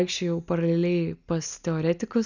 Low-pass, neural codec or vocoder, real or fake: 7.2 kHz; none; real